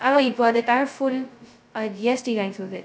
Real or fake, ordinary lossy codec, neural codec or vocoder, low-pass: fake; none; codec, 16 kHz, 0.2 kbps, FocalCodec; none